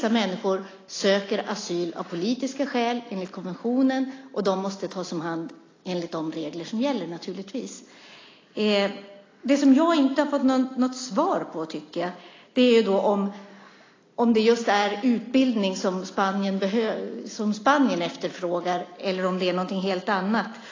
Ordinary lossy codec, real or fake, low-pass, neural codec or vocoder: AAC, 32 kbps; real; 7.2 kHz; none